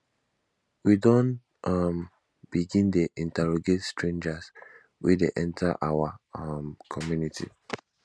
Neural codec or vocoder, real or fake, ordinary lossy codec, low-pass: none; real; none; none